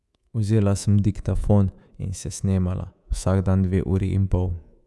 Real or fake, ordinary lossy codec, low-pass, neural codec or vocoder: fake; none; none; codec, 24 kHz, 3.1 kbps, DualCodec